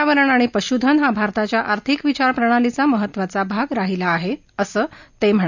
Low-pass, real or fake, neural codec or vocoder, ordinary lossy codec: 7.2 kHz; real; none; none